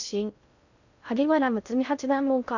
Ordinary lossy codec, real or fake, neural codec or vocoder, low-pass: none; fake; codec, 16 kHz in and 24 kHz out, 0.6 kbps, FocalCodec, streaming, 2048 codes; 7.2 kHz